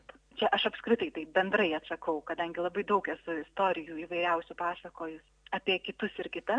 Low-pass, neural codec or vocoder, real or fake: 9.9 kHz; none; real